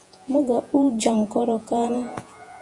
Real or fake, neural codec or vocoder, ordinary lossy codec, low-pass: fake; vocoder, 48 kHz, 128 mel bands, Vocos; Opus, 64 kbps; 10.8 kHz